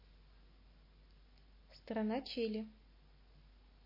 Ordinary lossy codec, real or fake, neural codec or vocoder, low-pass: MP3, 24 kbps; real; none; 5.4 kHz